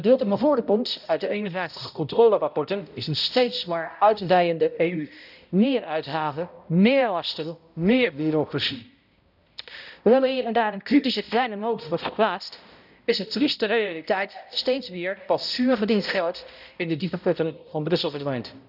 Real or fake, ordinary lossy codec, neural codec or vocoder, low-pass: fake; none; codec, 16 kHz, 0.5 kbps, X-Codec, HuBERT features, trained on balanced general audio; 5.4 kHz